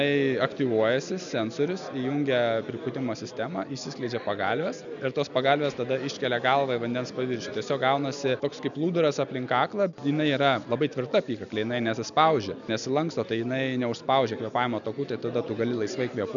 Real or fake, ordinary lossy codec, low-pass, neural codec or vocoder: real; MP3, 96 kbps; 7.2 kHz; none